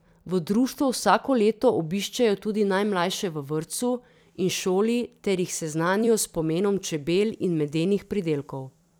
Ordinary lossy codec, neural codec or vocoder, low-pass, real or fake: none; vocoder, 44.1 kHz, 128 mel bands every 256 samples, BigVGAN v2; none; fake